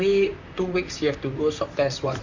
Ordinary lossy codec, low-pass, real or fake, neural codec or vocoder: Opus, 64 kbps; 7.2 kHz; fake; vocoder, 44.1 kHz, 128 mel bands, Pupu-Vocoder